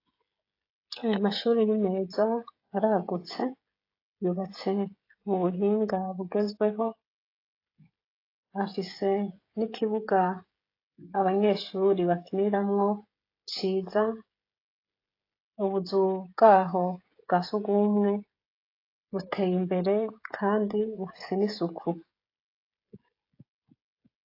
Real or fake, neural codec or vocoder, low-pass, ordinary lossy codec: fake; codec, 16 kHz, 16 kbps, FreqCodec, smaller model; 5.4 kHz; AAC, 32 kbps